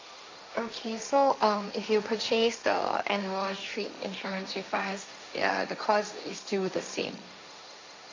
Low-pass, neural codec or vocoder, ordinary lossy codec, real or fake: 7.2 kHz; codec, 16 kHz, 1.1 kbps, Voila-Tokenizer; MP3, 64 kbps; fake